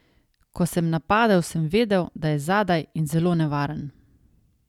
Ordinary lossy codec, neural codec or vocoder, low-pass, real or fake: none; none; 19.8 kHz; real